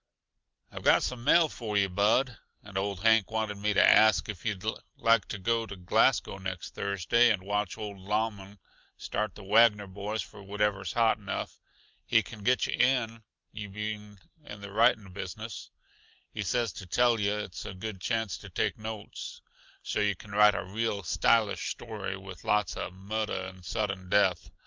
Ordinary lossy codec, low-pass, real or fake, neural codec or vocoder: Opus, 24 kbps; 7.2 kHz; real; none